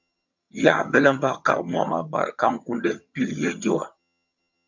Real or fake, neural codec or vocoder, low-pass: fake; vocoder, 22.05 kHz, 80 mel bands, HiFi-GAN; 7.2 kHz